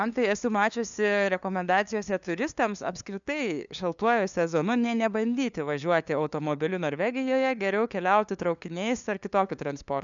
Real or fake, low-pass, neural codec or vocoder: fake; 7.2 kHz; codec, 16 kHz, 2 kbps, FunCodec, trained on LibriTTS, 25 frames a second